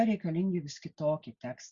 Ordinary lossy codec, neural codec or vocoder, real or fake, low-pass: Opus, 64 kbps; none; real; 7.2 kHz